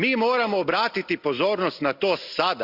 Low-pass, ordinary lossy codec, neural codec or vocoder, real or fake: 5.4 kHz; Opus, 64 kbps; none; real